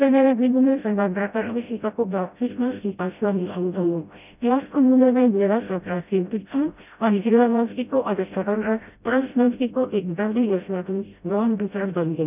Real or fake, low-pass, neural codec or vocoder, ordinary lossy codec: fake; 3.6 kHz; codec, 16 kHz, 0.5 kbps, FreqCodec, smaller model; none